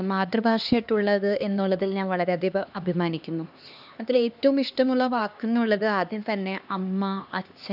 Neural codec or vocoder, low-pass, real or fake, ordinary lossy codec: codec, 16 kHz, 2 kbps, X-Codec, HuBERT features, trained on LibriSpeech; 5.4 kHz; fake; none